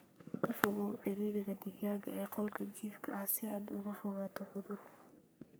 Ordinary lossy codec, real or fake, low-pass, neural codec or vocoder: none; fake; none; codec, 44.1 kHz, 3.4 kbps, Pupu-Codec